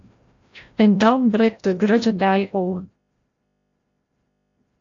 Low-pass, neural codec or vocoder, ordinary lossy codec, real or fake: 7.2 kHz; codec, 16 kHz, 0.5 kbps, FreqCodec, larger model; AAC, 48 kbps; fake